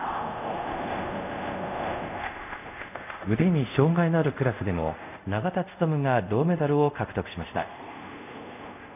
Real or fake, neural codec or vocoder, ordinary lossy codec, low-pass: fake; codec, 24 kHz, 0.5 kbps, DualCodec; none; 3.6 kHz